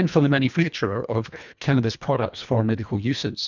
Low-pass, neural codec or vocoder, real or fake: 7.2 kHz; codec, 24 kHz, 1.5 kbps, HILCodec; fake